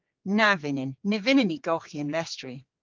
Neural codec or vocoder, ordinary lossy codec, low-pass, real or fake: codec, 16 kHz, 4 kbps, X-Codec, HuBERT features, trained on general audio; Opus, 32 kbps; 7.2 kHz; fake